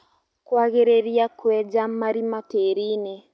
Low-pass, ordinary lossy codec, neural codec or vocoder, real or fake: none; none; none; real